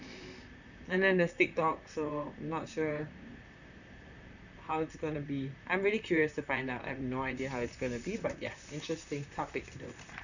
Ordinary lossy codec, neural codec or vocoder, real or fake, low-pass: none; vocoder, 44.1 kHz, 128 mel bands, Pupu-Vocoder; fake; 7.2 kHz